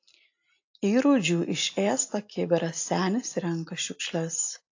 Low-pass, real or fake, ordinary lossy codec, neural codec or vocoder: 7.2 kHz; real; AAC, 48 kbps; none